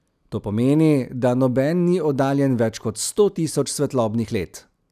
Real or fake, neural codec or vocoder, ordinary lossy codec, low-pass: real; none; none; 14.4 kHz